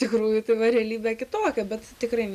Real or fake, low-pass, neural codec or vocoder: real; 14.4 kHz; none